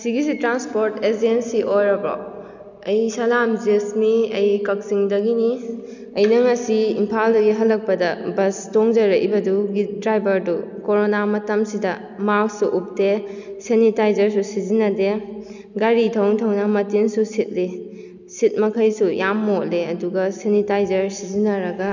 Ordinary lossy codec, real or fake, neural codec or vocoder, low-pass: none; real; none; 7.2 kHz